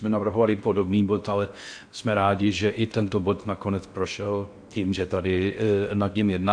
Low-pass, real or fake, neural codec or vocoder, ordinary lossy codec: 9.9 kHz; fake; codec, 16 kHz in and 24 kHz out, 0.6 kbps, FocalCodec, streaming, 4096 codes; AAC, 64 kbps